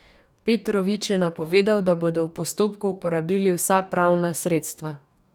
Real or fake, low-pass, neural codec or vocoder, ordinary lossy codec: fake; 19.8 kHz; codec, 44.1 kHz, 2.6 kbps, DAC; none